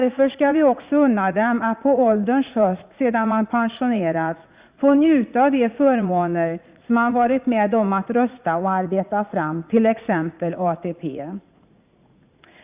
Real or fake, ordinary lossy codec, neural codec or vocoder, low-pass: fake; Opus, 64 kbps; vocoder, 44.1 kHz, 80 mel bands, Vocos; 3.6 kHz